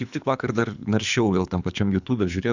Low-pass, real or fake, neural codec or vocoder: 7.2 kHz; fake; codec, 24 kHz, 3 kbps, HILCodec